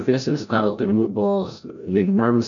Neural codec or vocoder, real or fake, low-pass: codec, 16 kHz, 0.5 kbps, FreqCodec, larger model; fake; 7.2 kHz